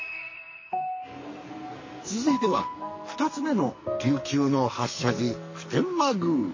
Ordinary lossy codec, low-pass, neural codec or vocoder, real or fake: MP3, 32 kbps; 7.2 kHz; codec, 44.1 kHz, 2.6 kbps, SNAC; fake